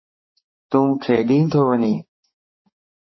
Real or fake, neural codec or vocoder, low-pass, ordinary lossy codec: fake; codec, 16 kHz, 4 kbps, X-Codec, HuBERT features, trained on balanced general audio; 7.2 kHz; MP3, 24 kbps